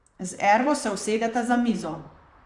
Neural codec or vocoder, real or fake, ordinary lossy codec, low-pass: vocoder, 44.1 kHz, 128 mel bands, Pupu-Vocoder; fake; none; 10.8 kHz